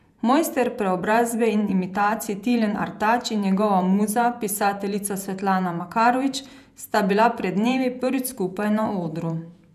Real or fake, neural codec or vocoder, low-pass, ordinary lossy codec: fake; vocoder, 48 kHz, 128 mel bands, Vocos; 14.4 kHz; none